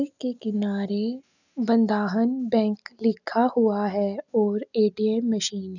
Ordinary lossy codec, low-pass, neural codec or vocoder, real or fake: none; 7.2 kHz; none; real